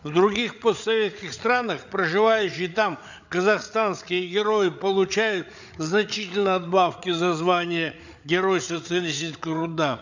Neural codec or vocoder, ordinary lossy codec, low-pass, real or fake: codec, 16 kHz, 8 kbps, FreqCodec, larger model; none; 7.2 kHz; fake